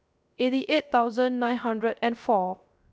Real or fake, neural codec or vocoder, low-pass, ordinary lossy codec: fake; codec, 16 kHz, 0.3 kbps, FocalCodec; none; none